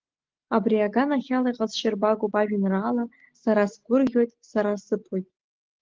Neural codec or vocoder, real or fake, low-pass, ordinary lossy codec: none; real; 7.2 kHz; Opus, 16 kbps